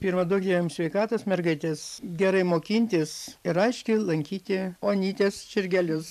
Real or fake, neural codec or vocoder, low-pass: fake; vocoder, 44.1 kHz, 128 mel bands every 512 samples, BigVGAN v2; 14.4 kHz